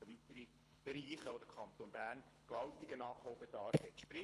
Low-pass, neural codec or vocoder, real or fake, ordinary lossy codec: none; codec, 24 kHz, 3 kbps, HILCodec; fake; none